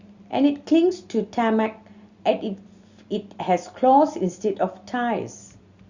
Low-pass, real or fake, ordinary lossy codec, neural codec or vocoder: 7.2 kHz; real; Opus, 64 kbps; none